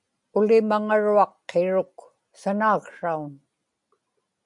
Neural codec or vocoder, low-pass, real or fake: none; 10.8 kHz; real